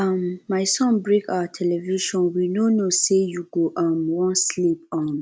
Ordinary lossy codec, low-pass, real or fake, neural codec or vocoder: none; none; real; none